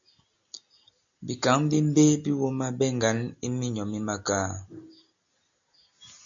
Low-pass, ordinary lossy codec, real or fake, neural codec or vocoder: 7.2 kHz; AAC, 64 kbps; real; none